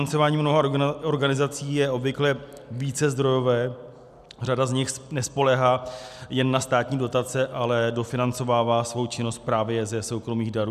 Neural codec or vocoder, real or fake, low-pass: none; real; 14.4 kHz